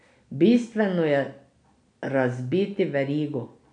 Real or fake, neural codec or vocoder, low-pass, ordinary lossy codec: real; none; 9.9 kHz; none